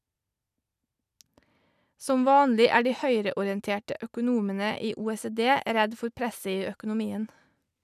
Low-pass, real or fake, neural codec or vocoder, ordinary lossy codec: 14.4 kHz; real; none; none